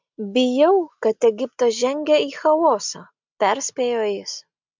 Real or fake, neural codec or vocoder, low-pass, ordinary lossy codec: real; none; 7.2 kHz; MP3, 64 kbps